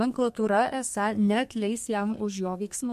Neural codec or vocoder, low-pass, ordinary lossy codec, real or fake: codec, 32 kHz, 1.9 kbps, SNAC; 14.4 kHz; MP3, 64 kbps; fake